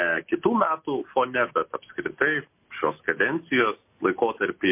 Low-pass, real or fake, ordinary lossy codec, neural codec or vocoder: 3.6 kHz; real; MP3, 24 kbps; none